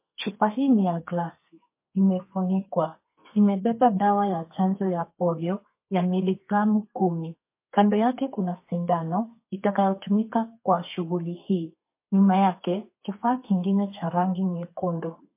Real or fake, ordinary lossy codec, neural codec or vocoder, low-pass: fake; MP3, 24 kbps; codec, 32 kHz, 1.9 kbps, SNAC; 3.6 kHz